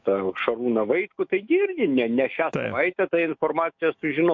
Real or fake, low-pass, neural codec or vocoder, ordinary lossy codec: fake; 7.2 kHz; autoencoder, 48 kHz, 128 numbers a frame, DAC-VAE, trained on Japanese speech; MP3, 48 kbps